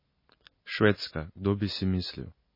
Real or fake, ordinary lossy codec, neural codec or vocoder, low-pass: real; MP3, 24 kbps; none; 5.4 kHz